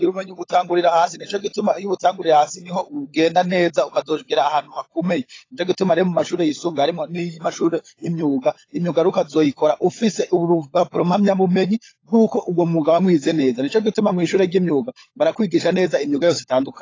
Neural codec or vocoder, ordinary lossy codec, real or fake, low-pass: codec, 16 kHz, 16 kbps, FunCodec, trained on LibriTTS, 50 frames a second; AAC, 32 kbps; fake; 7.2 kHz